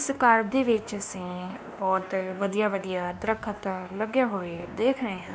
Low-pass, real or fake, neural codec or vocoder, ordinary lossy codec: none; fake; codec, 16 kHz, 2 kbps, X-Codec, WavLM features, trained on Multilingual LibriSpeech; none